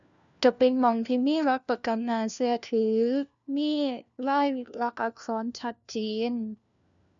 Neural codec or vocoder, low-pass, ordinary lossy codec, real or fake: codec, 16 kHz, 1 kbps, FunCodec, trained on LibriTTS, 50 frames a second; 7.2 kHz; none; fake